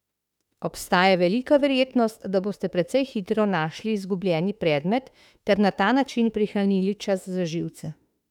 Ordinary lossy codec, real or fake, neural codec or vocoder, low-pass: none; fake; autoencoder, 48 kHz, 32 numbers a frame, DAC-VAE, trained on Japanese speech; 19.8 kHz